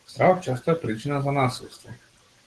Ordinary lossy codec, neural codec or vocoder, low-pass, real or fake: Opus, 16 kbps; none; 10.8 kHz; real